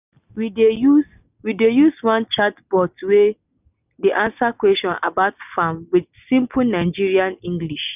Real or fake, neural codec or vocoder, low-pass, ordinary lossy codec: real; none; 3.6 kHz; none